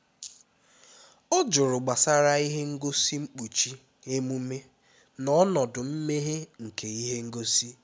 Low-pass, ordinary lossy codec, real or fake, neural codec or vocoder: none; none; real; none